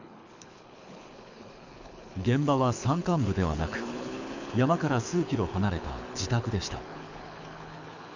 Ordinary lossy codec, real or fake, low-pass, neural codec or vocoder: none; fake; 7.2 kHz; codec, 24 kHz, 6 kbps, HILCodec